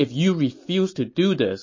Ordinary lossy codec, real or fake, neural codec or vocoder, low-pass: MP3, 32 kbps; real; none; 7.2 kHz